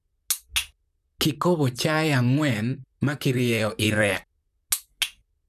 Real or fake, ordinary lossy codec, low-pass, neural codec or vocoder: fake; none; 14.4 kHz; vocoder, 44.1 kHz, 128 mel bands, Pupu-Vocoder